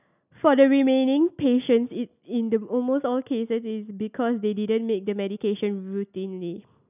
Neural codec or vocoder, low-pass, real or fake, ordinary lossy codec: none; 3.6 kHz; real; none